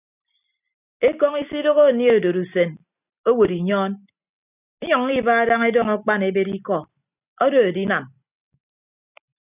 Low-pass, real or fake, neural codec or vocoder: 3.6 kHz; real; none